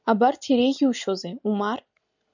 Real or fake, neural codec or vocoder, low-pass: real; none; 7.2 kHz